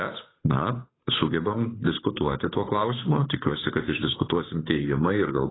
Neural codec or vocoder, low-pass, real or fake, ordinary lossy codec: codec, 16 kHz, 8 kbps, FunCodec, trained on Chinese and English, 25 frames a second; 7.2 kHz; fake; AAC, 16 kbps